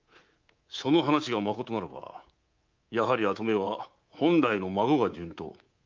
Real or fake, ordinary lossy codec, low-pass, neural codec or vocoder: fake; Opus, 24 kbps; 7.2 kHz; codec, 24 kHz, 3.1 kbps, DualCodec